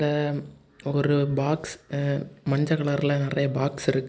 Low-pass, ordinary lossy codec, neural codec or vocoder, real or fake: none; none; none; real